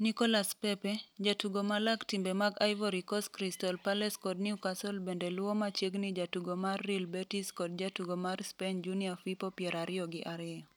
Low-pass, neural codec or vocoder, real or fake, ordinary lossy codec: none; none; real; none